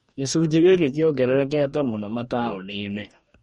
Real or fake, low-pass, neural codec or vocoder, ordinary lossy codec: fake; 19.8 kHz; codec, 44.1 kHz, 2.6 kbps, DAC; MP3, 48 kbps